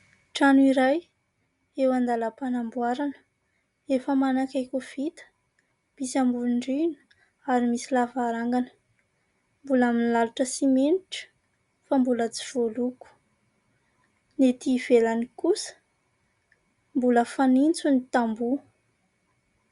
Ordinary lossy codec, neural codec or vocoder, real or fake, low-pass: Opus, 64 kbps; none; real; 10.8 kHz